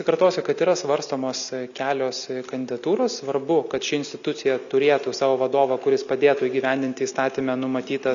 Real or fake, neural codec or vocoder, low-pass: real; none; 7.2 kHz